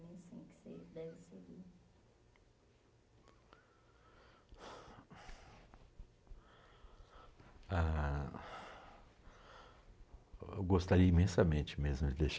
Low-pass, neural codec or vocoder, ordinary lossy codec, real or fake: none; none; none; real